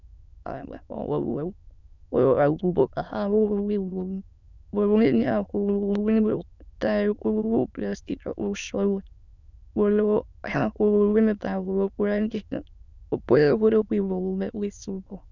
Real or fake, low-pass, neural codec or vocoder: fake; 7.2 kHz; autoencoder, 22.05 kHz, a latent of 192 numbers a frame, VITS, trained on many speakers